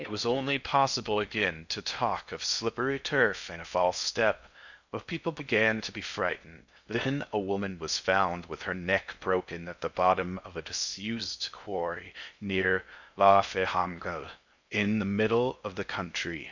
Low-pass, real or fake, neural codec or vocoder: 7.2 kHz; fake; codec, 16 kHz in and 24 kHz out, 0.6 kbps, FocalCodec, streaming, 2048 codes